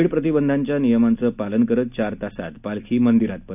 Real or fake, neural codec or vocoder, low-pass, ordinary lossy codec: real; none; 3.6 kHz; none